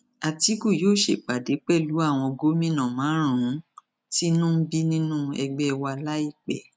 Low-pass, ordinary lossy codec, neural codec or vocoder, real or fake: none; none; none; real